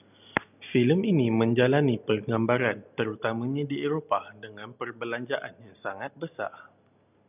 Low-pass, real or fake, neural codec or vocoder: 3.6 kHz; real; none